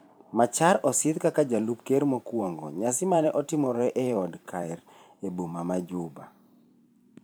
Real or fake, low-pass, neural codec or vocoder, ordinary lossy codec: fake; none; vocoder, 44.1 kHz, 128 mel bands every 512 samples, BigVGAN v2; none